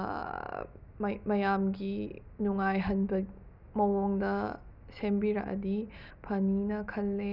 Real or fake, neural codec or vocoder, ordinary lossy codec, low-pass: real; none; none; 5.4 kHz